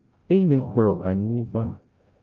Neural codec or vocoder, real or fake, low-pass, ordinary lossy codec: codec, 16 kHz, 0.5 kbps, FreqCodec, larger model; fake; 7.2 kHz; Opus, 32 kbps